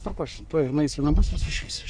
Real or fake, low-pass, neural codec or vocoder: fake; 9.9 kHz; codec, 44.1 kHz, 3.4 kbps, Pupu-Codec